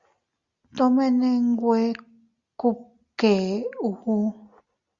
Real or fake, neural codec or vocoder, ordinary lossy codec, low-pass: real; none; Opus, 64 kbps; 7.2 kHz